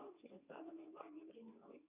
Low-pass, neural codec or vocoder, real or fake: 3.6 kHz; codec, 24 kHz, 0.9 kbps, WavTokenizer, medium speech release version 2; fake